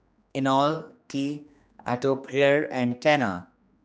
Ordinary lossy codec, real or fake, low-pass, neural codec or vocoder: none; fake; none; codec, 16 kHz, 2 kbps, X-Codec, HuBERT features, trained on general audio